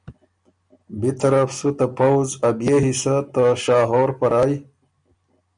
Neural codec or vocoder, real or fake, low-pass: none; real; 9.9 kHz